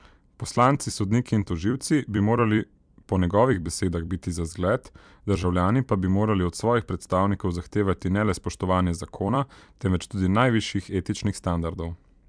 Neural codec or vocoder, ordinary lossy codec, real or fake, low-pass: vocoder, 44.1 kHz, 128 mel bands every 256 samples, BigVGAN v2; MP3, 96 kbps; fake; 9.9 kHz